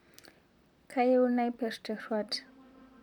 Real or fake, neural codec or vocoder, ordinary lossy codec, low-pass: fake; vocoder, 44.1 kHz, 128 mel bands, Pupu-Vocoder; none; 19.8 kHz